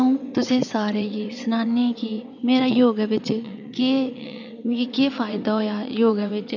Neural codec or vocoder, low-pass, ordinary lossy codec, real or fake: vocoder, 22.05 kHz, 80 mel bands, WaveNeXt; 7.2 kHz; none; fake